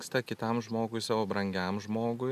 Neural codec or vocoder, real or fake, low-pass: none; real; 14.4 kHz